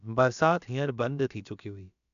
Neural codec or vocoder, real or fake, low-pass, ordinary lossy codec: codec, 16 kHz, about 1 kbps, DyCAST, with the encoder's durations; fake; 7.2 kHz; none